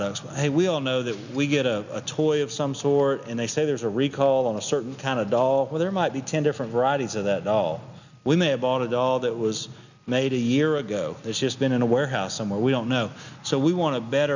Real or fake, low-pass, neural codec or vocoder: real; 7.2 kHz; none